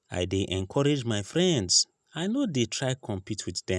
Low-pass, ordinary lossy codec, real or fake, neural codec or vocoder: none; none; real; none